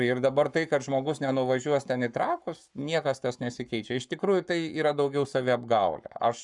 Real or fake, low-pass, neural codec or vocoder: fake; 10.8 kHz; codec, 44.1 kHz, 7.8 kbps, Pupu-Codec